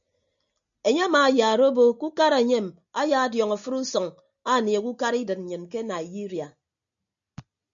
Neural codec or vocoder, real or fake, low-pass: none; real; 7.2 kHz